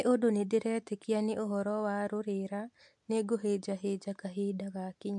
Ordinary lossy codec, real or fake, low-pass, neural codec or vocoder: MP3, 64 kbps; real; 10.8 kHz; none